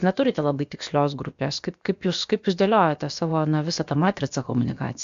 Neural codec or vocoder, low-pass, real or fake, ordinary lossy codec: codec, 16 kHz, about 1 kbps, DyCAST, with the encoder's durations; 7.2 kHz; fake; MP3, 64 kbps